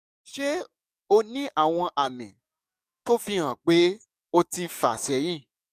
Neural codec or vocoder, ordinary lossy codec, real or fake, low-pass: codec, 44.1 kHz, 7.8 kbps, DAC; none; fake; 14.4 kHz